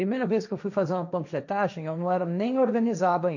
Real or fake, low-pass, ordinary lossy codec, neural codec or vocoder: fake; 7.2 kHz; none; codec, 16 kHz, 1.1 kbps, Voila-Tokenizer